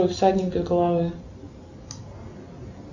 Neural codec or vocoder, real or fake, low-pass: none; real; 7.2 kHz